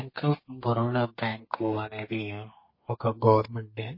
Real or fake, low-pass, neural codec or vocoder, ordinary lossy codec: fake; 5.4 kHz; codec, 32 kHz, 1.9 kbps, SNAC; MP3, 24 kbps